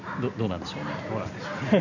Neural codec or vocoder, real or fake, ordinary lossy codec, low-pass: none; real; none; 7.2 kHz